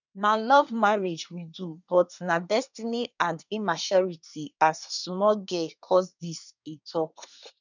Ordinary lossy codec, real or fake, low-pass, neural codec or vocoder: none; fake; 7.2 kHz; codec, 24 kHz, 1 kbps, SNAC